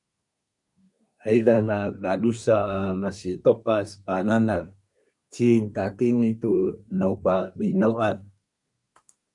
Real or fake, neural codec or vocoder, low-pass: fake; codec, 24 kHz, 1 kbps, SNAC; 10.8 kHz